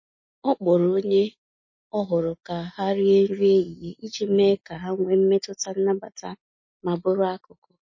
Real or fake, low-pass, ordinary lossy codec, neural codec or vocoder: real; 7.2 kHz; MP3, 32 kbps; none